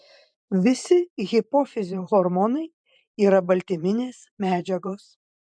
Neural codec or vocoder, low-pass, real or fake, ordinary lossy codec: vocoder, 44.1 kHz, 128 mel bands every 512 samples, BigVGAN v2; 9.9 kHz; fake; MP3, 64 kbps